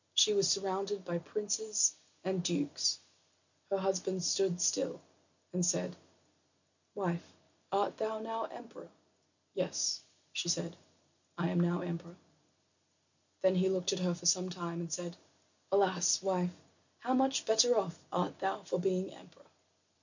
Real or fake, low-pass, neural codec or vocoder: real; 7.2 kHz; none